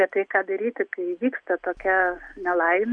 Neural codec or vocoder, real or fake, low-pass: none; real; 9.9 kHz